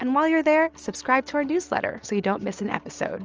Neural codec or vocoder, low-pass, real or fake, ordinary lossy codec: none; 7.2 kHz; real; Opus, 24 kbps